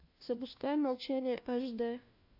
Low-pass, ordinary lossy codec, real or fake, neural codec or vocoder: 5.4 kHz; AAC, 48 kbps; fake; codec, 16 kHz, 1 kbps, FunCodec, trained on LibriTTS, 50 frames a second